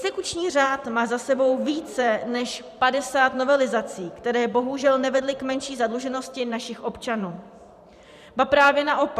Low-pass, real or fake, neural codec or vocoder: 14.4 kHz; fake; vocoder, 44.1 kHz, 128 mel bands every 512 samples, BigVGAN v2